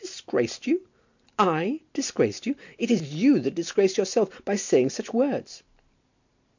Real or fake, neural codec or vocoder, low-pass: real; none; 7.2 kHz